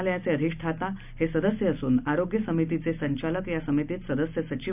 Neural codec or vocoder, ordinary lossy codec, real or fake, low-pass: none; none; real; 3.6 kHz